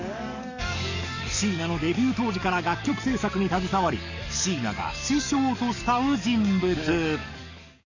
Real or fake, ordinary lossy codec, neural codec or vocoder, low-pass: fake; none; codec, 44.1 kHz, 7.8 kbps, DAC; 7.2 kHz